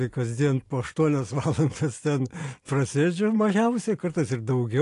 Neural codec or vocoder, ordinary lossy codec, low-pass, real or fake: none; AAC, 48 kbps; 10.8 kHz; real